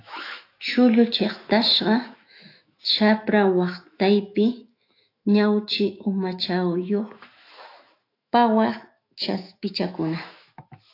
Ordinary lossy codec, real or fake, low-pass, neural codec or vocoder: AAC, 32 kbps; fake; 5.4 kHz; autoencoder, 48 kHz, 128 numbers a frame, DAC-VAE, trained on Japanese speech